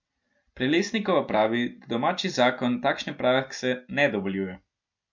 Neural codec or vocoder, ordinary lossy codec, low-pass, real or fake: none; MP3, 48 kbps; 7.2 kHz; real